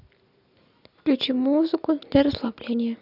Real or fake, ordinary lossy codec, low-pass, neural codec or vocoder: real; none; 5.4 kHz; none